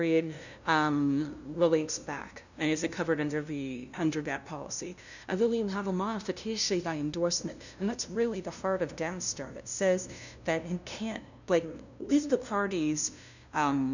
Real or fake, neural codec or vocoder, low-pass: fake; codec, 16 kHz, 0.5 kbps, FunCodec, trained on LibriTTS, 25 frames a second; 7.2 kHz